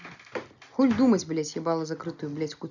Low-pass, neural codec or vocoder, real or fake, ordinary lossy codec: 7.2 kHz; none; real; none